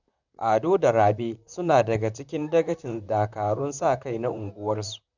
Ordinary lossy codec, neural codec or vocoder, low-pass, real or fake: none; vocoder, 44.1 kHz, 128 mel bands, Pupu-Vocoder; 7.2 kHz; fake